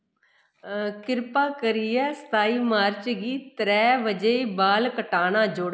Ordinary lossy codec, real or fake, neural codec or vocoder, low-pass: none; real; none; none